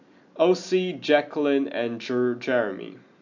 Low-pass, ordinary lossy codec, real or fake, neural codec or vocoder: 7.2 kHz; none; real; none